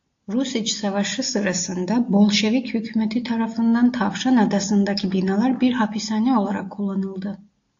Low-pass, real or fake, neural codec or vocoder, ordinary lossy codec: 7.2 kHz; real; none; AAC, 48 kbps